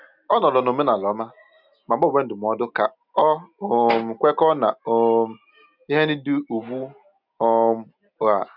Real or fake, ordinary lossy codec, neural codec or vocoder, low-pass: real; none; none; 5.4 kHz